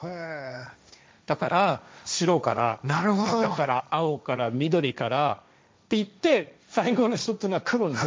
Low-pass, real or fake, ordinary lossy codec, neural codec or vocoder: none; fake; none; codec, 16 kHz, 1.1 kbps, Voila-Tokenizer